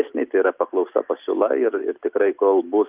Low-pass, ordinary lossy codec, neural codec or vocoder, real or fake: 3.6 kHz; Opus, 32 kbps; none; real